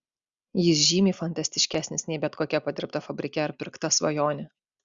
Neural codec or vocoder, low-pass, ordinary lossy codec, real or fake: none; 7.2 kHz; Opus, 64 kbps; real